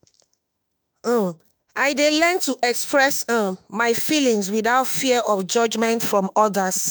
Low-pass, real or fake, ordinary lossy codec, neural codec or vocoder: none; fake; none; autoencoder, 48 kHz, 32 numbers a frame, DAC-VAE, trained on Japanese speech